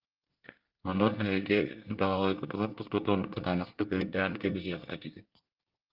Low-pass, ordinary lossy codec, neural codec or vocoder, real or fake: 5.4 kHz; Opus, 24 kbps; codec, 24 kHz, 1 kbps, SNAC; fake